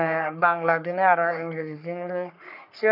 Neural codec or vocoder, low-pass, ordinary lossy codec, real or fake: codec, 44.1 kHz, 3.4 kbps, Pupu-Codec; 5.4 kHz; none; fake